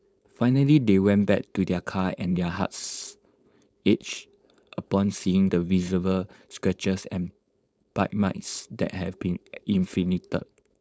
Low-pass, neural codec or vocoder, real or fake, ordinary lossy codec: none; codec, 16 kHz, 4.8 kbps, FACodec; fake; none